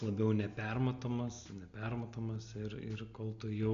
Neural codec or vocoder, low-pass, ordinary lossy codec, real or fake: none; 7.2 kHz; MP3, 64 kbps; real